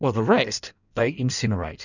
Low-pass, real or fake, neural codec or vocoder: 7.2 kHz; fake; codec, 16 kHz in and 24 kHz out, 1.1 kbps, FireRedTTS-2 codec